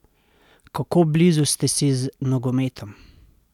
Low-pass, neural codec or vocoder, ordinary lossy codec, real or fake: 19.8 kHz; none; none; real